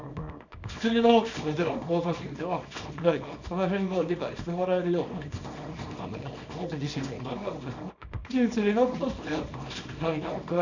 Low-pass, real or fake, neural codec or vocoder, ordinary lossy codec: 7.2 kHz; fake; codec, 24 kHz, 0.9 kbps, WavTokenizer, small release; none